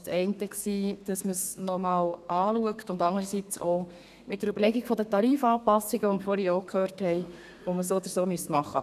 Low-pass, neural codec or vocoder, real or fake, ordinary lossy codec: 14.4 kHz; codec, 32 kHz, 1.9 kbps, SNAC; fake; none